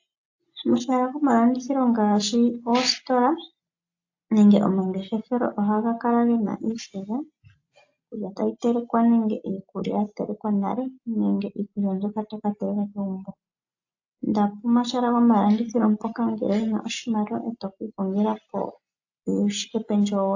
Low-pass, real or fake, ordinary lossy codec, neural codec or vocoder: 7.2 kHz; real; AAC, 48 kbps; none